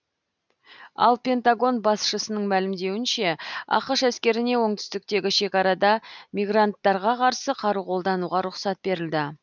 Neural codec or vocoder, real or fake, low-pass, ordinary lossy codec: none; real; 7.2 kHz; none